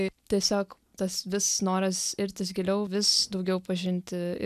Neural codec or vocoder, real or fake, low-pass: none; real; 14.4 kHz